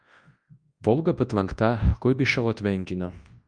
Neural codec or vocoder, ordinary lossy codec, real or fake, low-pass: codec, 24 kHz, 0.9 kbps, WavTokenizer, large speech release; Opus, 32 kbps; fake; 9.9 kHz